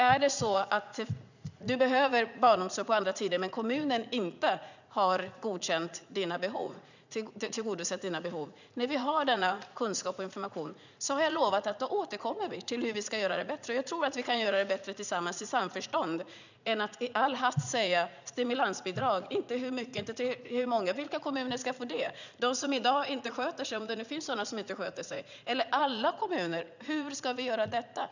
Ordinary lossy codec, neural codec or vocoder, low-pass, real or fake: none; vocoder, 44.1 kHz, 80 mel bands, Vocos; 7.2 kHz; fake